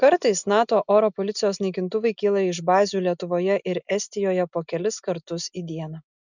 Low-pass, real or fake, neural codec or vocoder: 7.2 kHz; real; none